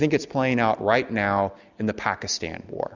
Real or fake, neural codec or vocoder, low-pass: real; none; 7.2 kHz